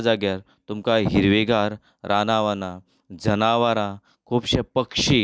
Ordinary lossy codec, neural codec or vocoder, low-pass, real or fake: none; none; none; real